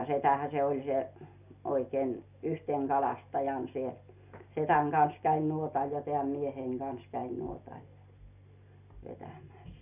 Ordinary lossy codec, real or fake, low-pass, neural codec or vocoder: none; real; 3.6 kHz; none